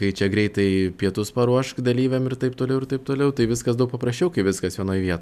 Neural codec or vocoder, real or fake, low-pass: none; real; 14.4 kHz